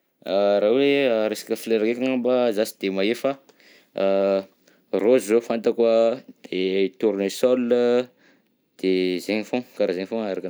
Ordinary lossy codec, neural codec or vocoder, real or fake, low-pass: none; none; real; none